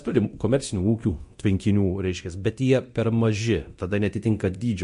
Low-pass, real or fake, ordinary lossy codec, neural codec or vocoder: 10.8 kHz; fake; MP3, 48 kbps; codec, 24 kHz, 0.9 kbps, DualCodec